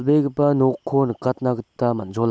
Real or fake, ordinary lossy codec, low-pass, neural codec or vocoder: real; none; none; none